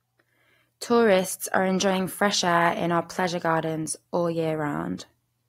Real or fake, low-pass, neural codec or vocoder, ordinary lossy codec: real; 19.8 kHz; none; AAC, 48 kbps